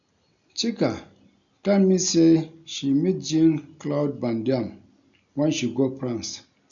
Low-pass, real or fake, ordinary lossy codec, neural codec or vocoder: 7.2 kHz; real; none; none